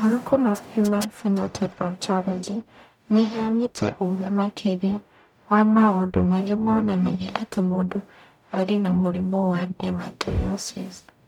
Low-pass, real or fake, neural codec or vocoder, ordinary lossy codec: 19.8 kHz; fake; codec, 44.1 kHz, 0.9 kbps, DAC; none